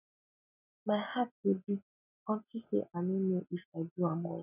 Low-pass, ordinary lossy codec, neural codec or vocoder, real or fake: 3.6 kHz; none; none; real